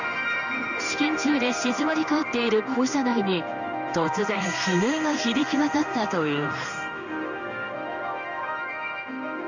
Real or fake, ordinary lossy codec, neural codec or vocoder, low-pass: fake; none; codec, 16 kHz in and 24 kHz out, 1 kbps, XY-Tokenizer; 7.2 kHz